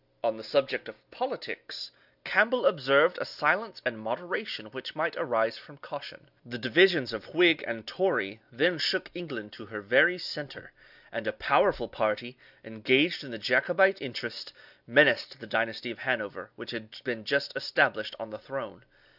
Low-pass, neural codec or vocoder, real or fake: 5.4 kHz; none; real